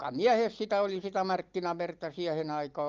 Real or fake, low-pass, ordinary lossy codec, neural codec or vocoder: real; 7.2 kHz; Opus, 32 kbps; none